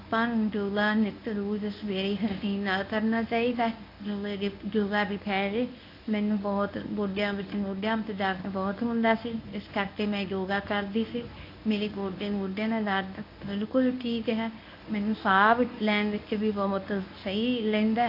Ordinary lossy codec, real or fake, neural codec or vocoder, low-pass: AAC, 32 kbps; fake; codec, 24 kHz, 0.9 kbps, WavTokenizer, medium speech release version 1; 5.4 kHz